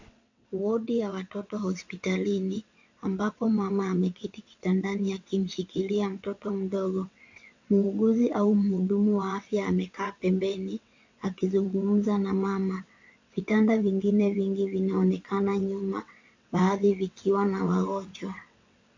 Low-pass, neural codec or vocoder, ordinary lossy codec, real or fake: 7.2 kHz; vocoder, 22.05 kHz, 80 mel bands, WaveNeXt; AAC, 48 kbps; fake